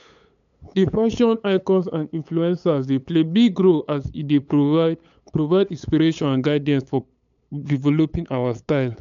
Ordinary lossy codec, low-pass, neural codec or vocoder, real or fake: none; 7.2 kHz; codec, 16 kHz, 8 kbps, FunCodec, trained on LibriTTS, 25 frames a second; fake